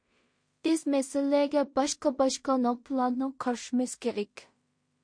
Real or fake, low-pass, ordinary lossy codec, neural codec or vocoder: fake; 9.9 kHz; MP3, 48 kbps; codec, 16 kHz in and 24 kHz out, 0.4 kbps, LongCat-Audio-Codec, two codebook decoder